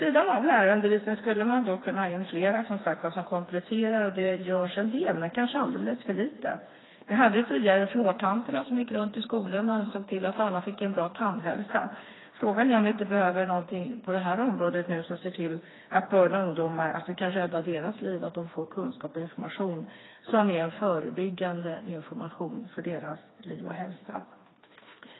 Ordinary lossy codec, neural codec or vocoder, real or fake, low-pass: AAC, 16 kbps; codec, 16 kHz, 2 kbps, FreqCodec, smaller model; fake; 7.2 kHz